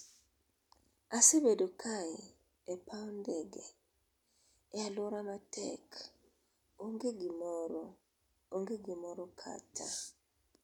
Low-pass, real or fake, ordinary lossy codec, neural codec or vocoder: none; real; none; none